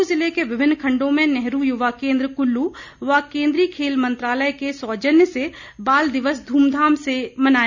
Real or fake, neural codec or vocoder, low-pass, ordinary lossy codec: real; none; 7.2 kHz; none